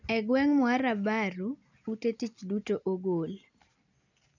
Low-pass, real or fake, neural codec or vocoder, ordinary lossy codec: 7.2 kHz; real; none; none